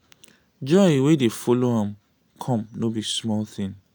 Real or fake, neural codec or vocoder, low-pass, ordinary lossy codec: real; none; none; none